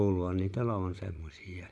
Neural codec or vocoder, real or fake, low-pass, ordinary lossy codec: vocoder, 24 kHz, 100 mel bands, Vocos; fake; none; none